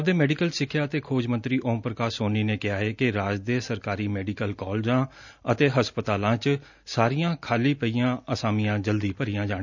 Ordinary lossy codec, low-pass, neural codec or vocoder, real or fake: none; 7.2 kHz; none; real